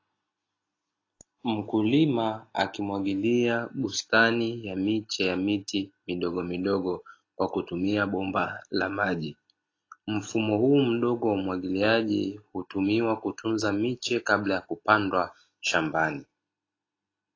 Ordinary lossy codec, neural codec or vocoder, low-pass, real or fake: AAC, 32 kbps; none; 7.2 kHz; real